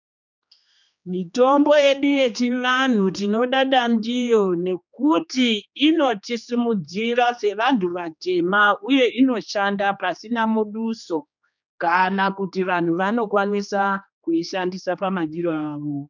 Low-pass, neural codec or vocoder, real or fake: 7.2 kHz; codec, 16 kHz, 2 kbps, X-Codec, HuBERT features, trained on general audio; fake